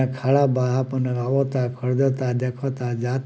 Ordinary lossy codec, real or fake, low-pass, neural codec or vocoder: none; real; none; none